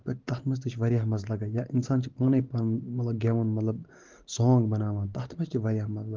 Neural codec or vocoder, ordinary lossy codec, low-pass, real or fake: none; Opus, 16 kbps; 7.2 kHz; real